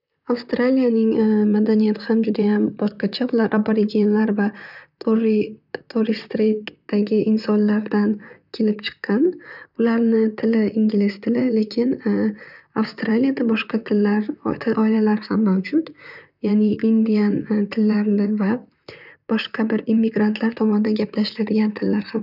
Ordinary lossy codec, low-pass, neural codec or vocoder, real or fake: none; 5.4 kHz; vocoder, 44.1 kHz, 128 mel bands, Pupu-Vocoder; fake